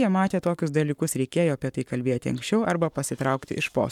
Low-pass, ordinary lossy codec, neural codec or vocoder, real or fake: 19.8 kHz; MP3, 96 kbps; codec, 44.1 kHz, 7.8 kbps, Pupu-Codec; fake